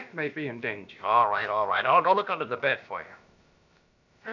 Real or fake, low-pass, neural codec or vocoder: fake; 7.2 kHz; codec, 16 kHz, about 1 kbps, DyCAST, with the encoder's durations